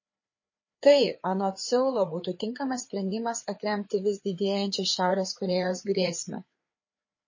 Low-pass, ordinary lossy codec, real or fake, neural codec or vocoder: 7.2 kHz; MP3, 32 kbps; fake; codec, 16 kHz, 4 kbps, FreqCodec, larger model